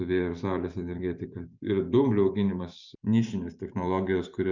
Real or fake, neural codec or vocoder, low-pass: fake; vocoder, 44.1 kHz, 128 mel bands every 512 samples, BigVGAN v2; 7.2 kHz